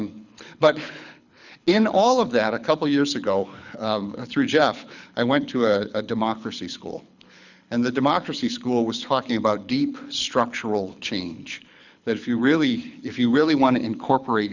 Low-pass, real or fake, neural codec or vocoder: 7.2 kHz; fake; codec, 24 kHz, 6 kbps, HILCodec